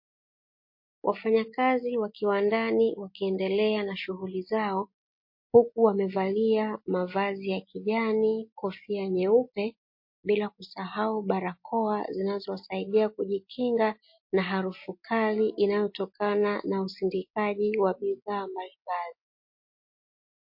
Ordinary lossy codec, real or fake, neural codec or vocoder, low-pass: MP3, 32 kbps; real; none; 5.4 kHz